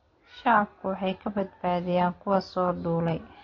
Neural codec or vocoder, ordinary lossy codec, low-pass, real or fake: none; AAC, 32 kbps; 7.2 kHz; real